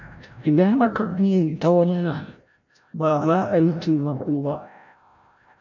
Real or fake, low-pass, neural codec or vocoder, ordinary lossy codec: fake; 7.2 kHz; codec, 16 kHz, 0.5 kbps, FreqCodec, larger model; none